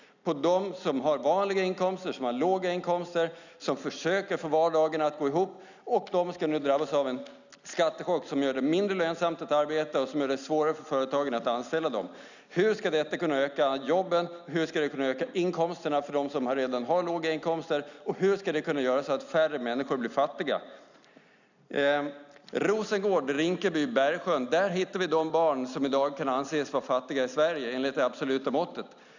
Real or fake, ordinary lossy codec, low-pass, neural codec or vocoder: real; none; 7.2 kHz; none